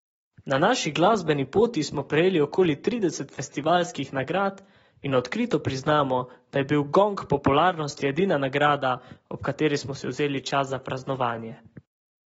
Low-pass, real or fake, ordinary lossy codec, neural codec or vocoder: 19.8 kHz; real; AAC, 24 kbps; none